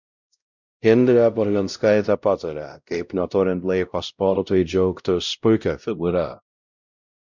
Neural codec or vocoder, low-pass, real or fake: codec, 16 kHz, 0.5 kbps, X-Codec, WavLM features, trained on Multilingual LibriSpeech; 7.2 kHz; fake